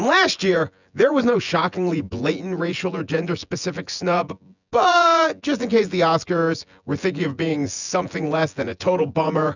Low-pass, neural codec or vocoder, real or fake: 7.2 kHz; vocoder, 24 kHz, 100 mel bands, Vocos; fake